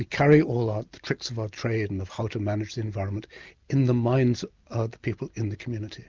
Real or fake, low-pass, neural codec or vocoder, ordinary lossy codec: real; 7.2 kHz; none; Opus, 24 kbps